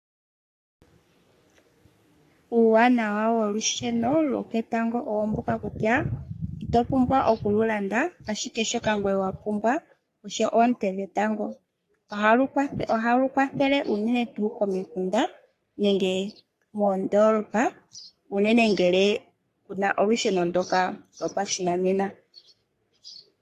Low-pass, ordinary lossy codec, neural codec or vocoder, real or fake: 14.4 kHz; AAC, 64 kbps; codec, 44.1 kHz, 3.4 kbps, Pupu-Codec; fake